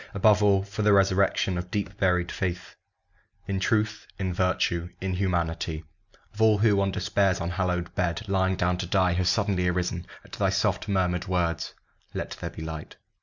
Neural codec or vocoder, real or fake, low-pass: none; real; 7.2 kHz